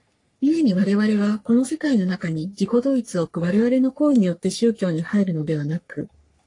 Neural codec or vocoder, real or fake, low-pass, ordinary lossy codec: codec, 44.1 kHz, 3.4 kbps, Pupu-Codec; fake; 10.8 kHz; AAC, 48 kbps